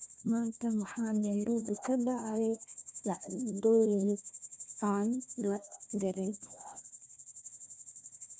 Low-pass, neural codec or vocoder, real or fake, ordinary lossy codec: none; codec, 16 kHz, 1 kbps, FunCodec, trained on Chinese and English, 50 frames a second; fake; none